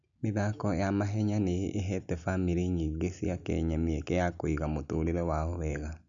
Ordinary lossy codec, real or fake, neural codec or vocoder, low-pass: none; real; none; 7.2 kHz